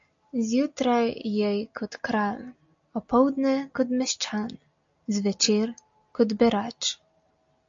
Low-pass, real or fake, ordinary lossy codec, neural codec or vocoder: 7.2 kHz; real; AAC, 64 kbps; none